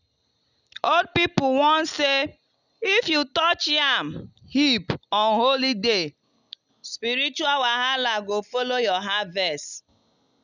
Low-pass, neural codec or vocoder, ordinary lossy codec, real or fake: 7.2 kHz; none; none; real